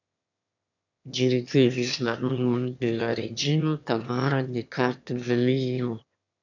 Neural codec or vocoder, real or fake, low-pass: autoencoder, 22.05 kHz, a latent of 192 numbers a frame, VITS, trained on one speaker; fake; 7.2 kHz